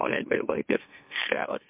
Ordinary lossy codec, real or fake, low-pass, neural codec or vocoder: MP3, 32 kbps; fake; 3.6 kHz; autoencoder, 44.1 kHz, a latent of 192 numbers a frame, MeloTTS